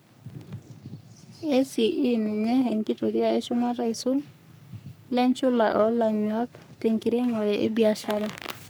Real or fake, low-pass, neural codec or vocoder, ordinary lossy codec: fake; none; codec, 44.1 kHz, 3.4 kbps, Pupu-Codec; none